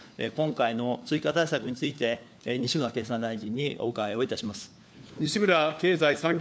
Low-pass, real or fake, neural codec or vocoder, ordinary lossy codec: none; fake; codec, 16 kHz, 4 kbps, FunCodec, trained on LibriTTS, 50 frames a second; none